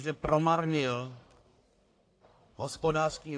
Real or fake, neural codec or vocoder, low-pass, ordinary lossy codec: fake; codec, 44.1 kHz, 1.7 kbps, Pupu-Codec; 9.9 kHz; AAC, 48 kbps